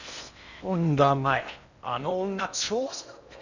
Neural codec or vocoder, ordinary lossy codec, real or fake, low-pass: codec, 16 kHz in and 24 kHz out, 0.8 kbps, FocalCodec, streaming, 65536 codes; none; fake; 7.2 kHz